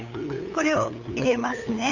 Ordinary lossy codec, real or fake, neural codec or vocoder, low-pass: none; fake; codec, 16 kHz, 8 kbps, FunCodec, trained on LibriTTS, 25 frames a second; 7.2 kHz